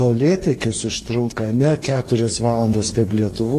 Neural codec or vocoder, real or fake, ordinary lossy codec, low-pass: codec, 44.1 kHz, 2.6 kbps, DAC; fake; AAC, 48 kbps; 14.4 kHz